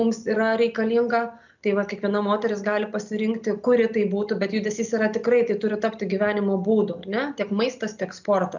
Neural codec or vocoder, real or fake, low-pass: none; real; 7.2 kHz